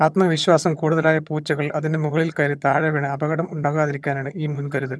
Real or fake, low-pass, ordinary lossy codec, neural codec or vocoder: fake; none; none; vocoder, 22.05 kHz, 80 mel bands, HiFi-GAN